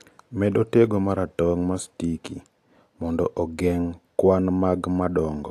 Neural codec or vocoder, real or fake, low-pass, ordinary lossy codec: none; real; 14.4 kHz; AAC, 64 kbps